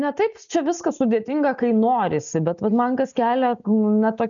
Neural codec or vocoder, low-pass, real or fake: none; 7.2 kHz; real